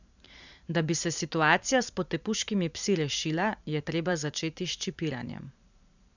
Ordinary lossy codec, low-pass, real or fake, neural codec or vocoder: none; 7.2 kHz; fake; vocoder, 44.1 kHz, 128 mel bands every 512 samples, BigVGAN v2